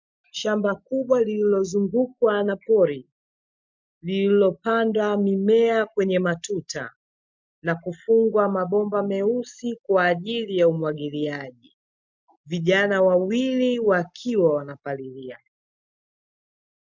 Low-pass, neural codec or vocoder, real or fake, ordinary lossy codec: 7.2 kHz; none; real; MP3, 64 kbps